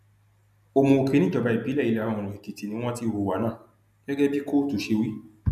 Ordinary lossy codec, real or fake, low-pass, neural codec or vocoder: none; real; 14.4 kHz; none